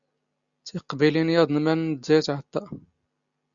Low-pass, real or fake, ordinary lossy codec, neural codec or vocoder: 7.2 kHz; real; Opus, 64 kbps; none